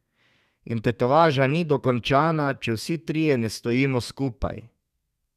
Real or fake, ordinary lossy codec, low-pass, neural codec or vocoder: fake; none; 14.4 kHz; codec, 32 kHz, 1.9 kbps, SNAC